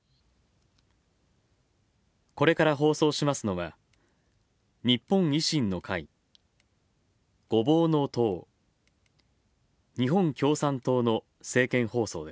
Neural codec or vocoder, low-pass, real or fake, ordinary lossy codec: none; none; real; none